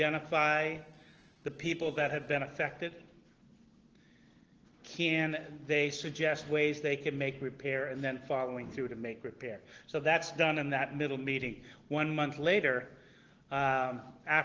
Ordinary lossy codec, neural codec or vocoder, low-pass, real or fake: Opus, 16 kbps; none; 7.2 kHz; real